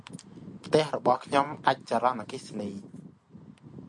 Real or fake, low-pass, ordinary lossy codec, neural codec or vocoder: real; 10.8 kHz; MP3, 64 kbps; none